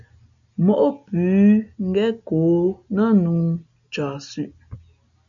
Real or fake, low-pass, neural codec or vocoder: real; 7.2 kHz; none